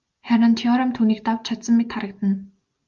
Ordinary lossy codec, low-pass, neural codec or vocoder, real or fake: Opus, 24 kbps; 7.2 kHz; none; real